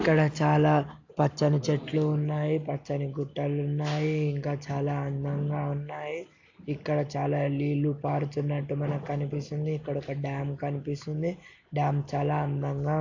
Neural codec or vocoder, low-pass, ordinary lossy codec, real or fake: none; 7.2 kHz; AAC, 48 kbps; real